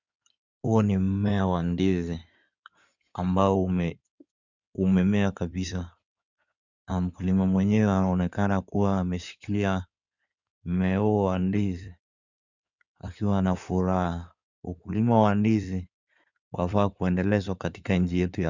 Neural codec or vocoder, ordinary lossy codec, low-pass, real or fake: codec, 16 kHz in and 24 kHz out, 2.2 kbps, FireRedTTS-2 codec; Opus, 64 kbps; 7.2 kHz; fake